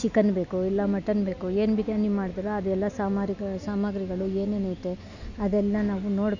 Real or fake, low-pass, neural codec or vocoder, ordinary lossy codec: real; 7.2 kHz; none; none